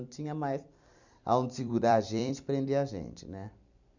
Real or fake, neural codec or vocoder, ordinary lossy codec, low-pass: fake; vocoder, 44.1 kHz, 128 mel bands every 256 samples, BigVGAN v2; none; 7.2 kHz